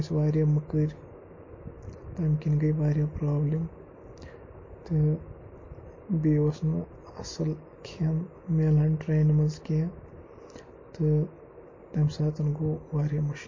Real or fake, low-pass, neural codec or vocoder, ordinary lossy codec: real; 7.2 kHz; none; MP3, 32 kbps